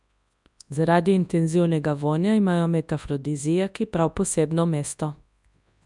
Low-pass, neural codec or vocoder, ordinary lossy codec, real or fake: 10.8 kHz; codec, 24 kHz, 0.9 kbps, WavTokenizer, large speech release; none; fake